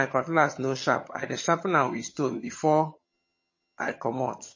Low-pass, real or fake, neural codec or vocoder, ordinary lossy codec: 7.2 kHz; fake; vocoder, 22.05 kHz, 80 mel bands, HiFi-GAN; MP3, 32 kbps